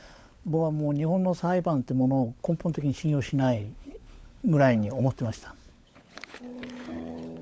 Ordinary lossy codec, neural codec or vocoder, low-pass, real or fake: none; codec, 16 kHz, 16 kbps, FunCodec, trained on LibriTTS, 50 frames a second; none; fake